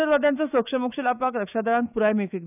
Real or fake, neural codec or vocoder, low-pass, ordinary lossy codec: fake; autoencoder, 48 kHz, 128 numbers a frame, DAC-VAE, trained on Japanese speech; 3.6 kHz; none